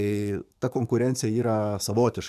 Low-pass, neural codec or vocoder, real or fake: 14.4 kHz; codec, 44.1 kHz, 7.8 kbps, DAC; fake